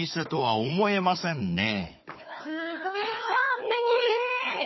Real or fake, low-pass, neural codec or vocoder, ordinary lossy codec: fake; 7.2 kHz; codec, 16 kHz, 4 kbps, FunCodec, trained on Chinese and English, 50 frames a second; MP3, 24 kbps